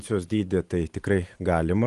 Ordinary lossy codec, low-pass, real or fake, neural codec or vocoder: Opus, 24 kbps; 10.8 kHz; real; none